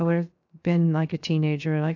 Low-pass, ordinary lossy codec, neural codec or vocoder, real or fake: 7.2 kHz; AAC, 48 kbps; codec, 16 kHz, 0.7 kbps, FocalCodec; fake